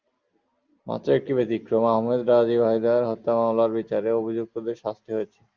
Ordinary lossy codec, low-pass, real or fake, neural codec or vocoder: Opus, 32 kbps; 7.2 kHz; real; none